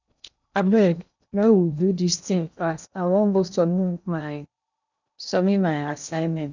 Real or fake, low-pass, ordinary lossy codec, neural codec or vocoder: fake; 7.2 kHz; none; codec, 16 kHz in and 24 kHz out, 0.8 kbps, FocalCodec, streaming, 65536 codes